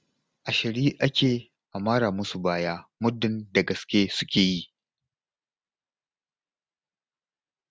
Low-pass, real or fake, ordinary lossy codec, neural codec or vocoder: none; real; none; none